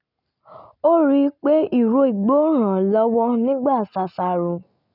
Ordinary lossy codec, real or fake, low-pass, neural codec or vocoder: none; real; 5.4 kHz; none